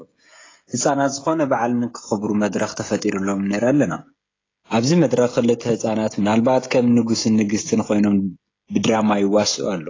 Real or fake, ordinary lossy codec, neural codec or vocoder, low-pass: fake; AAC, 32 kbps; codec, 16 kHz, 16 kbps, FreqCodec, smaller model; 7.2 kHz